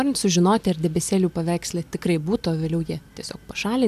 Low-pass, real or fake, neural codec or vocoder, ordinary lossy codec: 14.4 kHz; real; none; AAC, 96 kbps